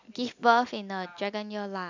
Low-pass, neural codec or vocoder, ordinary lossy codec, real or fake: 7.2 kHz; none; none; real